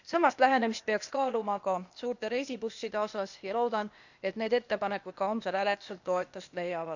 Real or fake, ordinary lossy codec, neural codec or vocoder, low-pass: fake; none; codec, 16 kHz, 0.8 kbps, ZipCodec; 7.2 kHz